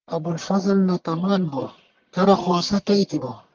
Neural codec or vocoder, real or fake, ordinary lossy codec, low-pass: codec, 44.1 kHz, 1.7 kbps, Pupu-Codec; fake; Opus, 32 kbps; 7.2 kHz